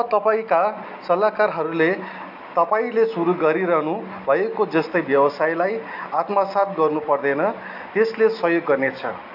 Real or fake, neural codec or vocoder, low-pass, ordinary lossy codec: real; none; 5.4 kHz; AAC, 48 kbps